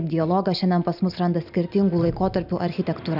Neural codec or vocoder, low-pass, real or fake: none; 5.4 kHz; real